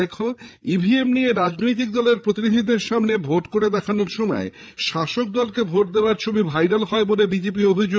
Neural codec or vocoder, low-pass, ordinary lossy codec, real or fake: codec, 16 kHz, 8 kbps, FreqCodec, larger model; none; none; fake